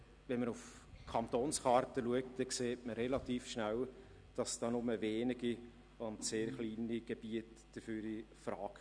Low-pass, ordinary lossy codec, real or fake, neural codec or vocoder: 9.9 kHz; none; real; none